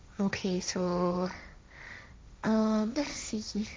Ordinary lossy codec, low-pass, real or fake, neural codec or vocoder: none; none; fake; codec, 16 kHz, 1.1 kbps, Voila-Tokenizer